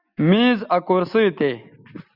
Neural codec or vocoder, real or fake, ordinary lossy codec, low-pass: none; real; Opus, 64 kbps; 5.4 kHz